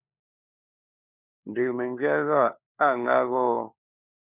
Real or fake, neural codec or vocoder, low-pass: fake; codec, 16 kHz, 4 kbps, FunCodec, trained on LibriTTS, 50 frames a second; 3.6 kHz